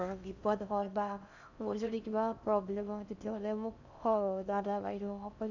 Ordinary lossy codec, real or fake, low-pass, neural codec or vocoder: none; fake; 7.2 kHz; codec, 16 kHz in and 24 kHz out, 0.6 kbps, FocalCodec, streaming, 4096 codes